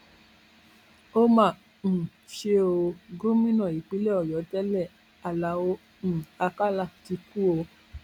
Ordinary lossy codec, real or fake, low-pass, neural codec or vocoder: none; real; 19.8 kHz; none